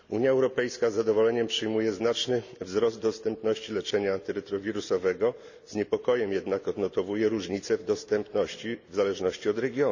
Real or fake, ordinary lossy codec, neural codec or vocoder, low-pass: real; none; none; 7.2 kHz